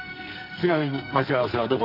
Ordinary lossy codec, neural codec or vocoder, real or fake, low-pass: AAC, 32 kbps; codec, 44.1 kHz, 2.6 kbps, SNAC; fake; 5.4 kHz